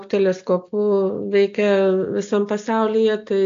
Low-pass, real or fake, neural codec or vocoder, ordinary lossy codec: 7.2 kHz; real; none; AAC, 48 kbps